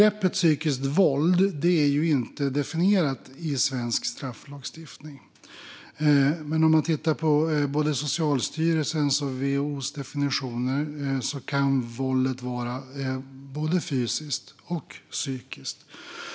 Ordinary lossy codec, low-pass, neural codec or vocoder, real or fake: none; none; none; real